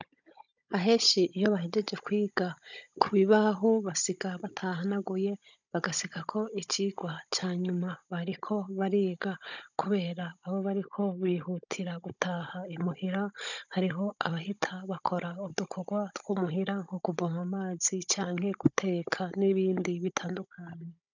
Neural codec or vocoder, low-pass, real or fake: codec, 16 kHz, 16 kbps, FunCodec, trained on Chinese and English, 50 frames a second; 7.2 kHz; fake